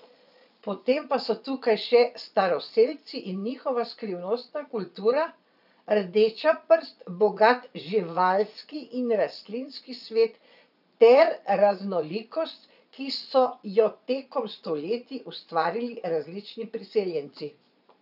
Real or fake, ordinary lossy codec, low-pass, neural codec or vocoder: fake; none; 5.4 kHz; vocoder, 22.05 kHz, 80 mel bands, Vocos